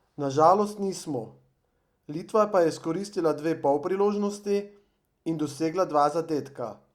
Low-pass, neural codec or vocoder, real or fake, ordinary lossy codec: 19.8 kHz; none; real; Opus, 64 kbps